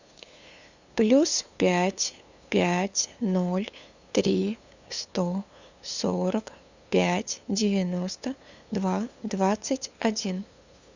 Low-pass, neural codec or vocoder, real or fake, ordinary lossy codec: 7.2 kHz; codec, 16 kHz, 2 kbps, FunCodec, trained on LibriTTS, 25 frames a second; fake; Opus, 64 kbps